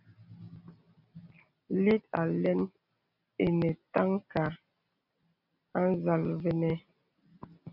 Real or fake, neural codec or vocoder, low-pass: real; none; 5.4 kHz